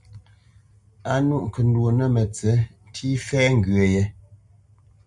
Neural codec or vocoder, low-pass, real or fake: none; 10.8 kHz; real